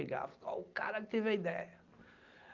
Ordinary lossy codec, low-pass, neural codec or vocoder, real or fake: Opus, 24 kbps; 7.2 kHz; none; real